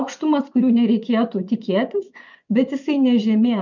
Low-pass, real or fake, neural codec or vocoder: 7.2 kHz; fake; vocoder, 24 kHz, 100 mel bands, Vocos